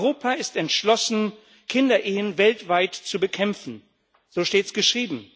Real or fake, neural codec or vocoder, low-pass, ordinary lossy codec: real; none; none; none